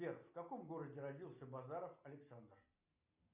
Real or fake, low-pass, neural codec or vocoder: real; 3.6 kHz; none